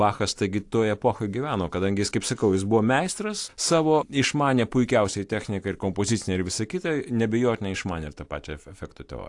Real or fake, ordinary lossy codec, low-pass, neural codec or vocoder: real; AAC, 64 kbps; 10.8 kHz; none